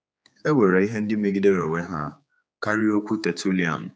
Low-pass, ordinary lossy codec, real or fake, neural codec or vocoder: none; none; fake; codec, 16 kHz, 4 kbps, X-Codec, HuBERT features, trained on general audio